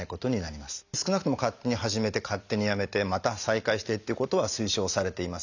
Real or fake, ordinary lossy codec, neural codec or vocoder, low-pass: real; none; none; 7.2 kHz